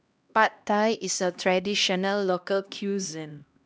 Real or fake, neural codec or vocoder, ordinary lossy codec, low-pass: fake; codec, 16 kHz, 1 kbps, X-Codec, HuBERT features, trained on LibriSpeech; none; none